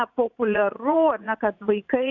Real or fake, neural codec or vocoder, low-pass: fake; vocoder, 44.1 kHz, 80 mel bands, Vocos; 7.2 kHz